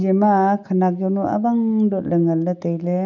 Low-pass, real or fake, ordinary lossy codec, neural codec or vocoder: 7.2 kHz; real; none; none